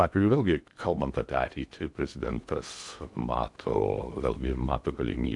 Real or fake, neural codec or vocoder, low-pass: fake; codec, 16 kHz in and 24 kHz out, 0.8 kbps, FocalCodec, streaming, 65536 codes; 10.8 kHz